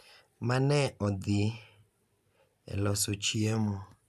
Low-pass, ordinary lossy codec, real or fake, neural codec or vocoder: 14.4 kHz; MP3, 96 kbps; real; none